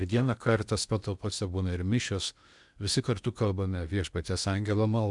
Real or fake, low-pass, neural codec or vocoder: fake; 10.8 kHz; codec, 16 kHz in and 24 kHz out, 0.8 kbps, FocalCodec, streaming, 65536 codes